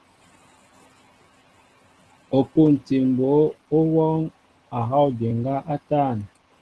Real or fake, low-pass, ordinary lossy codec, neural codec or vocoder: real; 10.8 kHz; Opus, 16 kbps; none